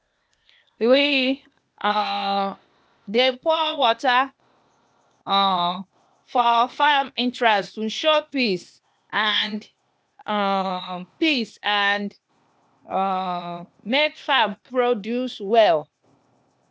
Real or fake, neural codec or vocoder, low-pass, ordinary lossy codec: fake; codec, 16 kHz, 0.8 kbps, ZipCodec; none; none